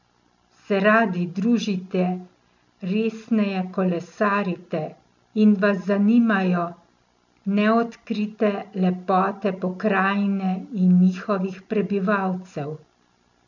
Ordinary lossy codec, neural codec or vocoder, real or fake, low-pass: none; none; real; 7.2 kHz